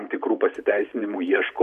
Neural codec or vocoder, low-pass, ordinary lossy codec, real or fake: vocoder, 44.1 kHz, 128 mel bands every 512 samples, BigVGAN v2; 14.4 kHz; MP3, 64 kbps; fake